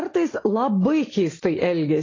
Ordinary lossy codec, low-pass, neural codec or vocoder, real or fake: AAC, 32 kbps; 7.2 kHz; none; real